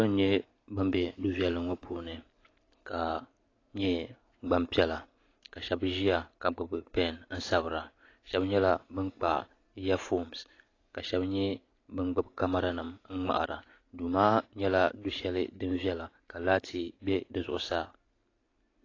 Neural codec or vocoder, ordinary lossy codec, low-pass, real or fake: none; AAC, 32 kbps; 7.2 kHz; real